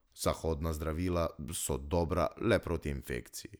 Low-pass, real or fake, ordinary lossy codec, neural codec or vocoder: none; real; none; none